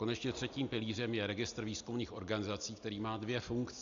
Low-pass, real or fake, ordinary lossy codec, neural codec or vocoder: 7.2 kHz; real; AAC, 96 kbps; none